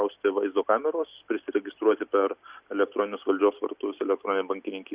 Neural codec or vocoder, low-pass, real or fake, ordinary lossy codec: none; 3.6 kHz; real; Opus, 64 kbps